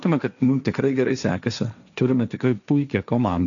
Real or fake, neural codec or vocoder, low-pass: fake; codec, 16 kHz, 1.1 kbps, Voila-Tokenizer; 7.2 kHz